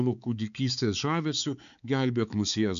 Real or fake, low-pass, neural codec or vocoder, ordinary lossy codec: fake; 7.2 kHz; codec, 16 kHz, 4 kbps, X-Codec, HuBERT features, trained on balanced general audio; AAC, 96 kbps